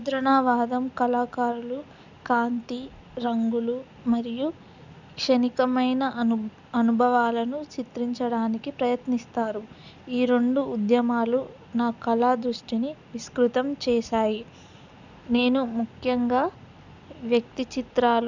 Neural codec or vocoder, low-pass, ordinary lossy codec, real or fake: none; 7.2 kHz; none; real